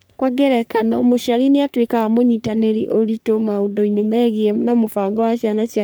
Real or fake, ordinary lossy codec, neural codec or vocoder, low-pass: fake; none; codec, 44.1 kHz, 3.4 kbps, Pupu-Codec; none